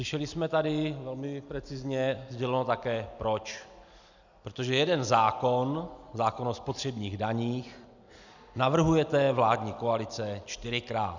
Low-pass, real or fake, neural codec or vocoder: 7.2 kHz; real; none